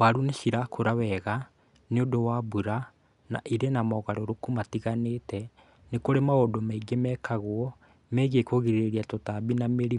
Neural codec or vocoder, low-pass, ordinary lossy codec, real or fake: none; 10.8 kHz; none; real